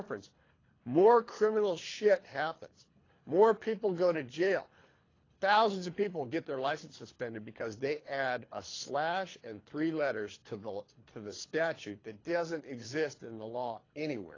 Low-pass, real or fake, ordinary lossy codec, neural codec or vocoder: 7.2 kHz; fake; AAC, 32 kbps; codec, 24 kHz, 3 kbps, HILCodec